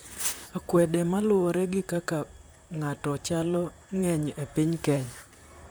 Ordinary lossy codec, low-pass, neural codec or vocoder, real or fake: none; none; vocoder, 44.1 kHz, 128 mel bands, Pupu-Vocoder; fake